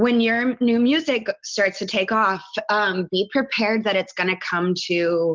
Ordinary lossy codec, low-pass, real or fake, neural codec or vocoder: Opus, 24 kbps; 7.2 kHz; real; none